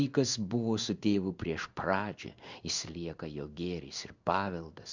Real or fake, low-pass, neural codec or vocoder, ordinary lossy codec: fake; 7.2 kHz; codec, 16 kHz in and 24 kHz out, 1 kbps, XY-Tokenizer; Opus, 64 kbps